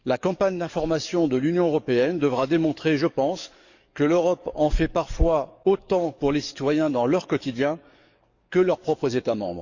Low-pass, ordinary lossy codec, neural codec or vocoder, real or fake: 7.2 kHz; Opus, 64 kbps; codec, 44.1 kHz, 7.8 kbps, DAC; fake